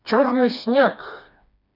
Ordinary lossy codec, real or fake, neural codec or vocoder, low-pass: none; fake; codec, 16 kHz, 2 kbps, FreqCodec, smaller model; 5.4 kHz